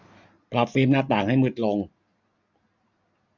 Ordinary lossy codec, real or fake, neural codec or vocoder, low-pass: none; real; none; 7.2 kHz